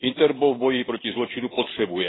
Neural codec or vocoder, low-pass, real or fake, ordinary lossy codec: none; 7.2 kHz; real; AAC, 16 kbps